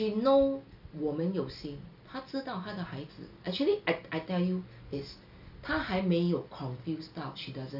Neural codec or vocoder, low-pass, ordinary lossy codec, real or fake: none; 5.4 kHz; none; real